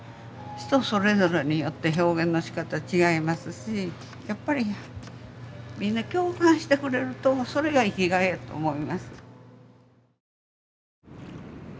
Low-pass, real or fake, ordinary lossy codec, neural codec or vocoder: none; real; none; none